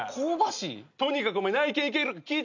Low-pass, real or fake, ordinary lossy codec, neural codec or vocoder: 7.2 kHz; real; none; none